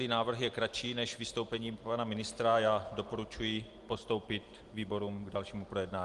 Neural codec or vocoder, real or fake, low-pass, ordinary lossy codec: none; real; 10.8 kHz; Opus, 24 kbps